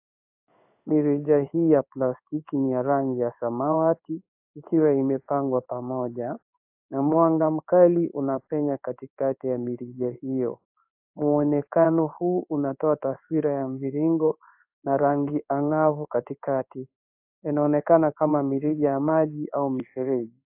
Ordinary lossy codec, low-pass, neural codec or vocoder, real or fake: AAC, 32 kbps; 3.6 kHz; codec, 16 kHz in and 24 kHz out, 1 kbps, XY-Tokenizer; fake